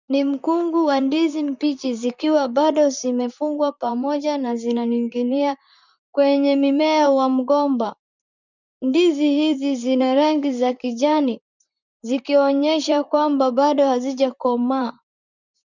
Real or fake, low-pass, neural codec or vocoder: fake; 7.2 kHz; vocoder, 44.1 kHz, 128 mel bands, Pupu-Vocoder